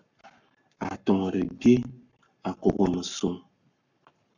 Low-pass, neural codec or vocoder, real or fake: 7.2 kHz; codec, 44.1 kHz, 7.8 kbps, Pupu-Codec; fake